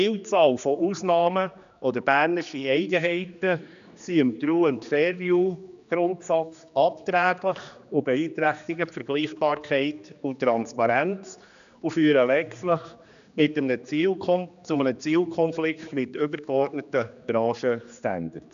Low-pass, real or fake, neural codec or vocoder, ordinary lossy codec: 7.2 kHz; fake; codec, 16 kHz, 2 kbps, X-Codec, HuBERT features, trained on general audio; none